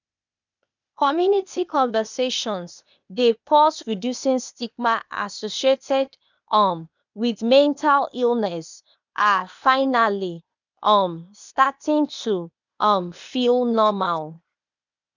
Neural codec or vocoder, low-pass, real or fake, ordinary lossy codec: codec, 16 kHz, 0.8 kbps, ZipCodec; 7.2 kHz; fake; none